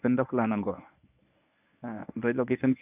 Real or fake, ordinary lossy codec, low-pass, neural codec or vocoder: fake; none; 3.6 kHz; codec, 24 kHz, 0.9 kbps, WavTokenizer, medium speech release version 1